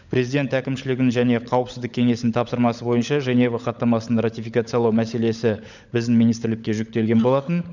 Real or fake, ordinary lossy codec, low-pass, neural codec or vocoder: fake; none; 7.2 kHz; codec, 16 kHz, 16 kbps, FunCodec, trained on LibriTTS, 50 frames a second